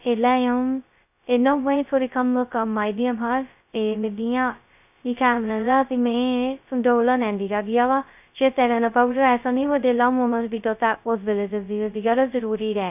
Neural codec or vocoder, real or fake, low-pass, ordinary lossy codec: codec, 16 kHz, 0.2 kbps, FocalCodec; fake; 3.6 kHz; none